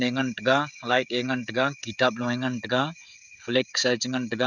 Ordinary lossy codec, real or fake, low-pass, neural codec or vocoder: none; fake; 7.2 kHz; codec, 16 kHz, 16 kbps, FreqCodec, smaller model